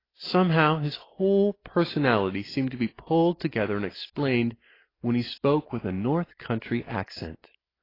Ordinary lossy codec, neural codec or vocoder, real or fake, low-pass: AAC, 24 kbps; none; real; 5.4 kHz